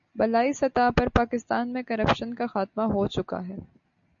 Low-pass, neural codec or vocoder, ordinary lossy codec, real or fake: 7.2 kHz; none; AAC, 64 kbps; real